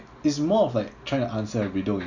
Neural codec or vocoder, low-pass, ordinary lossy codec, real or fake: none; 7.2 kHz; none; real